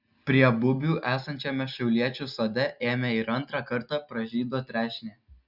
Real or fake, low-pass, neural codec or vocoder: real; 5.4 kHz; none